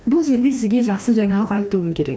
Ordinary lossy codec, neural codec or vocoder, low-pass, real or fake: none; codec, 16 kHz, 1 kbps, FreqCodec, larger model; none; fake